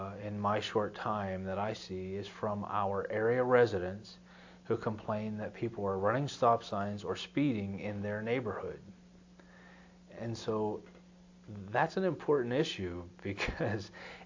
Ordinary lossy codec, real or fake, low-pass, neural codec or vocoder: AAC, 48 kbps; real; 7.2 kHz; none